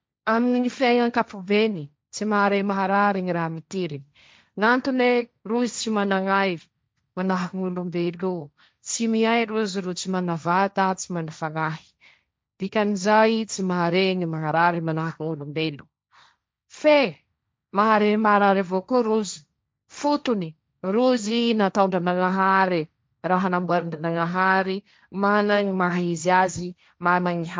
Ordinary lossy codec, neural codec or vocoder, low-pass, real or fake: none; codec, 16 kHz, 1.1 kbps, Voila-Tokenizer; none; fake